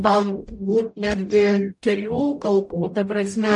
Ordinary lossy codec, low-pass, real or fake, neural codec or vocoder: MP3, 48 kbps; 10.8 kHz; fake; codec, 44.1 kHz, 0.9 kbps, DAC